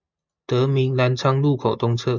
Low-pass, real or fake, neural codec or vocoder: 7.2 kHz; real; none